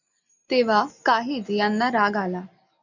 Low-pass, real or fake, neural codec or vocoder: 7.2 kHz; real; none